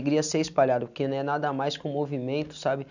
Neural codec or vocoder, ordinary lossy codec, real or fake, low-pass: none; none; real; 7.2 kHz